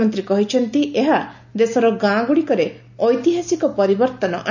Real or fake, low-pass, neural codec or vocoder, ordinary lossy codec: real; 7.2 kHz; none; none